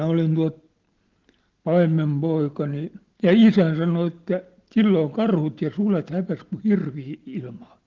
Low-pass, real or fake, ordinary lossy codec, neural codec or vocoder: 7.2 kHz; real; Opus, 16 kbps; none